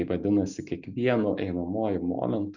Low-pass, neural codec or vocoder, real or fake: 7.2 kHz; none; real